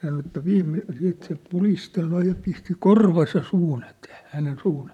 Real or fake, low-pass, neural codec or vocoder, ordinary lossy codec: fake; 19.8 kHz; vocoder, 44.1 kHz, 128 mel bands, Pupu-Vocoder; none